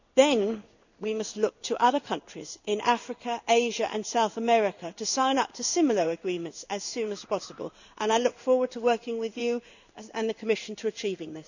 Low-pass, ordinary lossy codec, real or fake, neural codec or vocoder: 7.2 kHz; none; fake; codec, 16 kHz in and 24 kHz out, 1 kbps, XY-Tokenizer